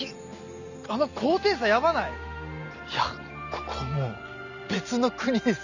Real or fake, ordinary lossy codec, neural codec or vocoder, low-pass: real; none; none; 7.2 kHz